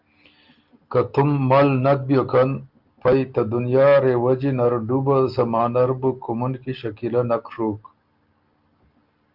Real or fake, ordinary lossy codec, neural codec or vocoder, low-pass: real; Opus, 32 kbps; none; 5.4 kHz